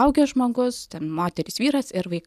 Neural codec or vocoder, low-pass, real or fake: none; 14.4 kHz; real